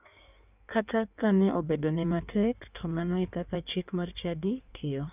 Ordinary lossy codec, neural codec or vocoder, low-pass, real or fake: none; codec, 16 kHz in and 24 kHz out, 1.1 kbps, FireRedTTS-2 codec; 3.6 kHz; fake